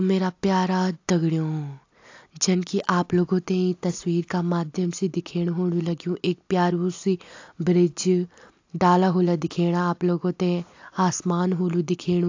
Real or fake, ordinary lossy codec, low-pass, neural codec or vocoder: real; AAC, 48 kbps; 7.2 kHz; none